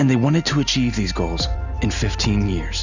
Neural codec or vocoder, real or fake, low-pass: none; real; 7.2 kHz